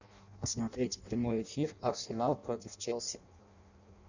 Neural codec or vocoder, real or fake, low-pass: codec, 16 kHz in and 24 kHz out, 0.6 kbps, FireRedTTS-2 codec; fake; 7.2 kHz